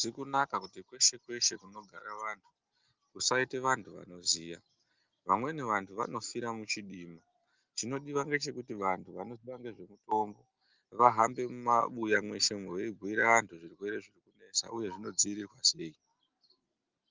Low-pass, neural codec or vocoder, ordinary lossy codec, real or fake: 7.2 kHz; none; Opus, 24 kbps; real